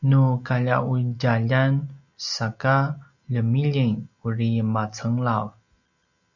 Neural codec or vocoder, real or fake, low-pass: none; real; 7.2 kHz